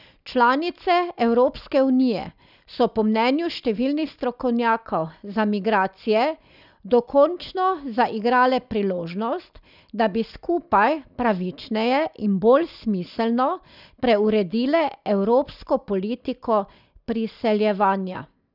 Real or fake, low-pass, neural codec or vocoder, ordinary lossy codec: real; 5.4 kHz; none; none